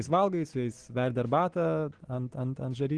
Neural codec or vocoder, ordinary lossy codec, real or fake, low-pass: none; Opus, 16 kbps; real; 9.9 kHz